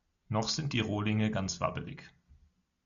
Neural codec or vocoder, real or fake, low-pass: none; real; 7.2 kHz